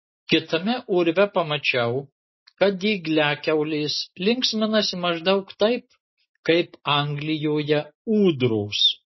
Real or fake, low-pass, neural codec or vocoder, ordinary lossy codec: real; 7.2 kHz; none; MP3, 24 kbps